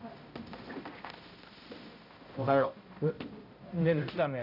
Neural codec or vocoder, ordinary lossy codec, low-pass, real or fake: codec, 16 kHz, 0.5 kbps, X-Codec, HuBERT features, trained on balanced general audio; none; 5.4 kHz; fake